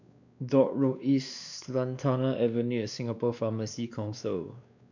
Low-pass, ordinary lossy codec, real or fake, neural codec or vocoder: 7.2 kHz; none; fake; codec, 16 kHz, 2 kbps, X-Codec, WavLM features, trained on Multilingual LibriSpeech